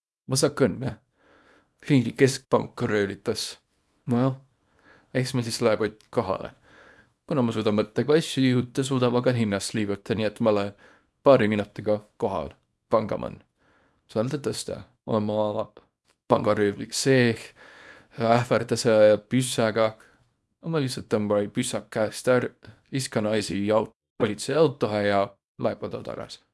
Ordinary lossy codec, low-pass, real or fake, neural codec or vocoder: none; none; fake; codec, 24 kHz, 0.9 kbps, WavTokenizer, small release